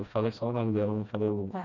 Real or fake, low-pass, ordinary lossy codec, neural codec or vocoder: fake; 7.2 kHz; none; codec, 16 kHz, 1 kbps, FreqCodec, smaller model